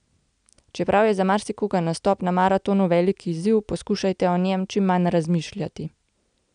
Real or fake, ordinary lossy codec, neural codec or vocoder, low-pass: real; none; none; 9.9 kHz